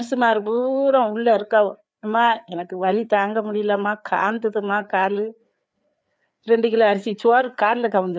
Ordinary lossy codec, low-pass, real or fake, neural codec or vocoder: none; none; fake; codec, 16 kHz, 4 kbps, FreqCodec, larger model